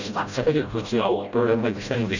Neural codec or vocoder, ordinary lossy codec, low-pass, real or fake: codec, 16 kHz, 0.5 kbps, FreqCodec, smaller model; none; 7.2 kHz; fake